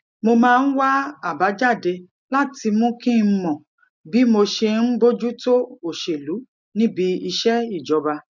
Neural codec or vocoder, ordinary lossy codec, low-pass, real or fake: none; none; 7.2 kHz; real